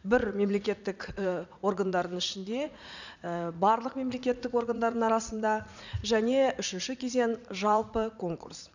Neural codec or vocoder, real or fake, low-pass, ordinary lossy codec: vocoder, 44.1 kHz, 80 mel bands, Vocos; fake; 7.2 kHz; none